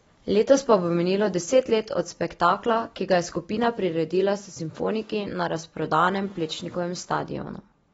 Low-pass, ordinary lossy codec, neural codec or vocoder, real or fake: 19.8 kHz; AAC, 24 kbps; none; real